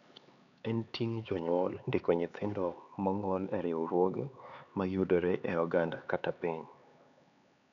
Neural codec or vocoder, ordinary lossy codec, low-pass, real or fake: codec, 16 kHz, 4 kbps, X-Codec, HuBERT features, trained on LibriSpeech; none; 7.2 kHz; fake